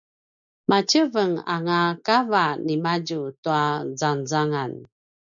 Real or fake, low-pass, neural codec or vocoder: real; 7.2 kHz; none